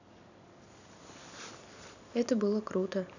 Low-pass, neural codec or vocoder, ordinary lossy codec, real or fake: 7.2 kHz; vocoder, 44.1 kHz, 128 mel bands every 256 samples, BigVGAN v2; none; fake